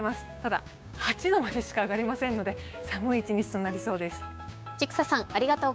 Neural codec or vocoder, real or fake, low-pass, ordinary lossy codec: codec, 16 kHz, 6 kbps, DAC; fake; none; none